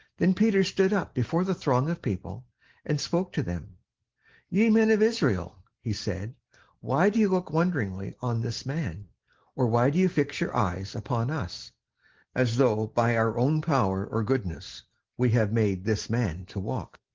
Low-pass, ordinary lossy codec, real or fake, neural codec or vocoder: 7.2 kHz; Opus, 16 kbps; real; none